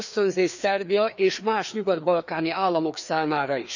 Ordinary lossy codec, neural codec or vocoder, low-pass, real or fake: none; codec, 16 kHz, 2 kbps, FreqCodec, larger model; 7.2 kHz; fake